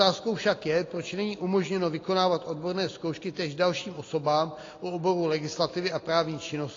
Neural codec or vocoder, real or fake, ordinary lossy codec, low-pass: none; real; AAC, 32 kbps; 7.2 kHz